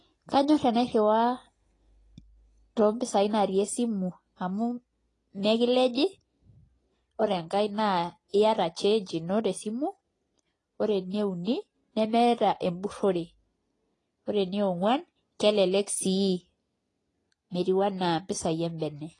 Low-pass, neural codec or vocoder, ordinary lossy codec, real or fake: 10.8 kHz; none; AAC, 32 kbps; real